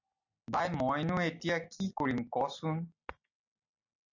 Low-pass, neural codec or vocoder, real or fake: 7.2 kHz; none; real